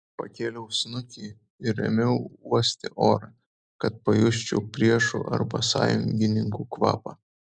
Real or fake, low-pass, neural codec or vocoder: real; 9.9 kHz; none